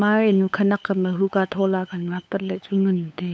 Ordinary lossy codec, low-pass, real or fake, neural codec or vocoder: none; none; fake; codec, 16 kHz, 8 kbps, FunCodec, trained on LibriTTS, 25 frames a second